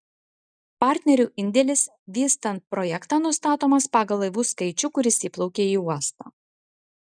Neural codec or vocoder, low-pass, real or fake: none; 9.9 kHz; real